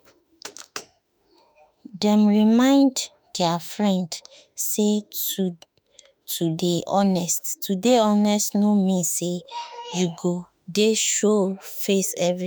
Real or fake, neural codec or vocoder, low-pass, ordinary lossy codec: fake; autoencoder, 48 kHz, 32 numbers a frame, DAC-VAE, trained on Japanese speech; none; none